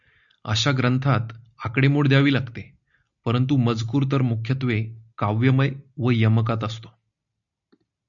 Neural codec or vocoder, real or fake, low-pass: none; real; 7.2 kHz